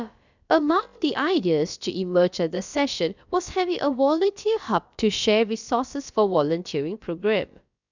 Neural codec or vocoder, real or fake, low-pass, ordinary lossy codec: codec, 16 kHz, about 1 kbps, DyCAST, with the encoder's durations; fake; 7.2 kHz; none